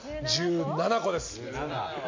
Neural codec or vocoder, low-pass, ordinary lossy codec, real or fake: none; 7.2 kHz; MP3, 48 kbps; real